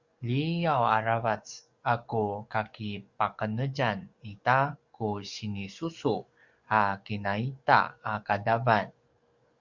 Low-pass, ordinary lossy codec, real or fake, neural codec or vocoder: 7.2 kHz; Opus, 64 kbps; fake; codec, 44.1 kHz, 7.8 kbps, DAC